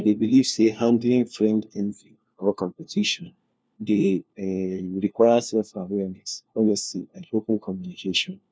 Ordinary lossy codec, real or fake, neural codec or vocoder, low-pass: none; fake; codec, 16 kHz, 1 kbps, FunCodec, trained on LibriTTS, 50 frames a second; none